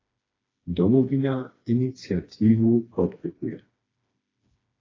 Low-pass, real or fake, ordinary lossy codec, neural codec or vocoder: 7.2 kHz; fake; AAC, 32 kbps; codec, 16 kHz, 2 kbps, FreqCodec, smaller model